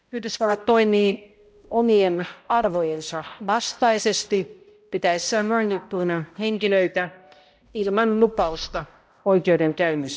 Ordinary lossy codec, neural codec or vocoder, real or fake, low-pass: none; codec, 16 kHz, 0.5 kbps, X-Codec, HuBERT features, trained on balanced general audio; fake; none